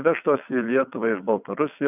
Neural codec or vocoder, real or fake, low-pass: vocoder, 22.05 kHz, 80 mel bands, WaveNeXt; fake; 3.6 kHz